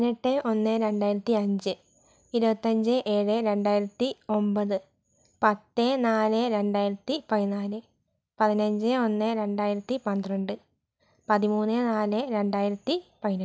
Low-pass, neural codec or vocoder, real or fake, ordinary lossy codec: none; none; real; none